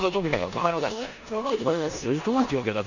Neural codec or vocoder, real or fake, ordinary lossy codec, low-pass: codec, 16 kHz in and 24 kHz out, 0.9 kbps, LongCat-Audio-Codec, four codebook decoder; fake; none; 7.2 kHz